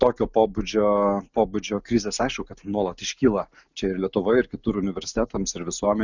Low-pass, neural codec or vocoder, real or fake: 7.2 kHz; none; real